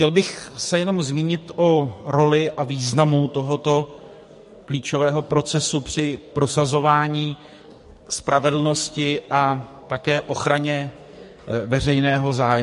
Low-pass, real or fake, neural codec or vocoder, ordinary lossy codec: 14.4 kHz; fake; codec, 44.1 kHz, 2.6 kbps, SNAC; MP3, 48 kbps